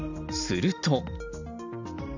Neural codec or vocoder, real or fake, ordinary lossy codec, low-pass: none; real; none; 7.2 kHz